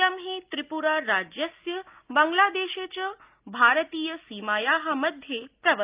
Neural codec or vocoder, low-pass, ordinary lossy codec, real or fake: none; 3.6 kHz; Opus, 24 kbps; real